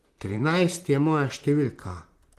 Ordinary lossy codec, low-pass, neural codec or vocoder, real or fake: Opus, 24 kbps; 14.4 kHz; vocoder, 44.1 kHz, 128 mel bands, Pupu-Vocoder; fake